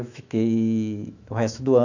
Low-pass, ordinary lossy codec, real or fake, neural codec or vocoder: 7.2 kHz; none; fake; autoencoder, 48 kHz, 128 numbers a frame, DAC-VAE, trained on Japanese speech